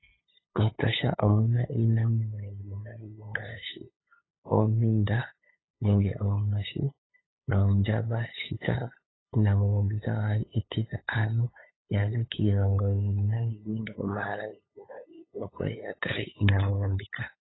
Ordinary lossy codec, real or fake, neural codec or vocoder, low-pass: AAC, 16 kbps; fake; codec, 16 kHz, 8 kbps, FunCodec, trained on LibriTTS, 25 frames a second; 7.2 kHz